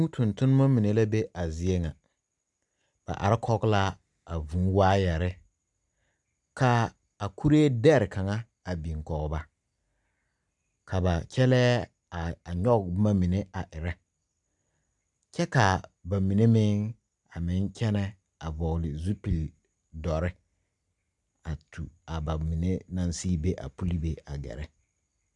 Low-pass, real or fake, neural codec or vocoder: 10.8 kHz; real; none